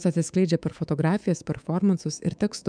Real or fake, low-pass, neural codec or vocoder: fake; 9.9 kHz; codec, 24 kHz, 3.1 kbps, DualCodec